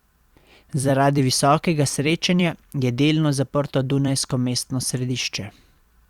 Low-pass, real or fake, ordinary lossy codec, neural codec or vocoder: 19.8 kHz; fake; Opus, 64 kbps; vocoder, 44.1 kHz, 128 mel bands every 256 samples, BigVGAN v2